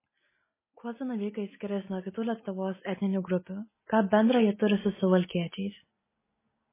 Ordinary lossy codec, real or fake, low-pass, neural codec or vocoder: MP3, 16 kbps; real; 3.6 kHz; none